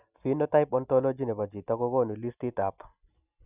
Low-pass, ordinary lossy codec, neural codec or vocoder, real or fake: 3.6 kHz; none; none; real